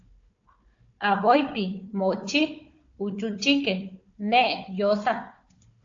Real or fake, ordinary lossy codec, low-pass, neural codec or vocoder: fake; AAC, 48 kbps; 7.2 kHz; codec, 16 kHz, 4 kbps, FunCodec, trained on Chinese and English, 50 frames a second